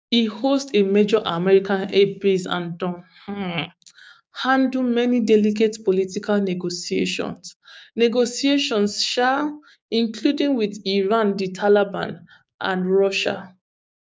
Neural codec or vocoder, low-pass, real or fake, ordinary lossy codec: codec, 16 kHz, 6 kbps, DAC; none; fake; none